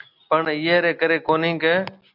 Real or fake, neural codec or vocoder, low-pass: real; none; 5.4 kHz